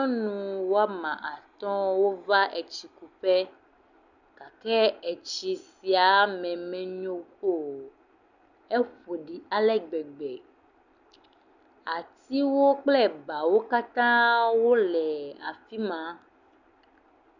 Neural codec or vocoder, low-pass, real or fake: none; 7.2 kHz; real